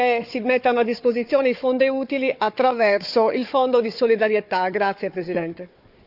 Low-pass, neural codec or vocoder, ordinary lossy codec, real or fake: 5.4 kHz; codec, 16 kHz, 4 kbps, FunCodec, trained on Chinese and English, 50 frames a second; none; fake